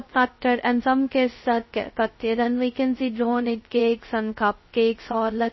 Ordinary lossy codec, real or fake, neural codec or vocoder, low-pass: MP3, 24 kbps; fake; codec, 16 kHz, 0.2 kbps, FocalCodec; 7.2 kHz